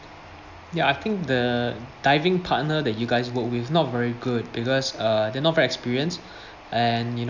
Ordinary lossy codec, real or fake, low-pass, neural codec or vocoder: none; real; 7.2 kHz; none